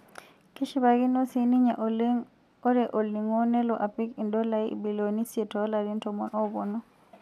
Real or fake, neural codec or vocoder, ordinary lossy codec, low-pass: real; none; none; 14.4 kHz